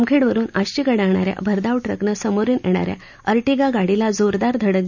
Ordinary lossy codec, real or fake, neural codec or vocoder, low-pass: none; real; none; 7.2 kHz